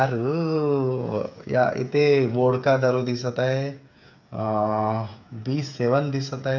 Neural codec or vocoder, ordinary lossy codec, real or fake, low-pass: codec, 16 kHz, 8 kbps, FreqCodec, smaller model; none; fake; 7.2 kHz